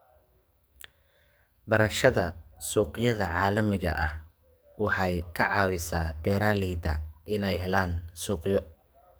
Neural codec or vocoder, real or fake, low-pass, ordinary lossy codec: codec, 44.1 kHz, 2.6 kbps, SNAC; fake; none; none